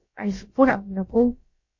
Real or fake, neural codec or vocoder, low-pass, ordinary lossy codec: fake; codec, 16 kHz, about 1 kbps, DyCAST, with the encoder's durations; 7.2 kHz; MP3, 32 kbps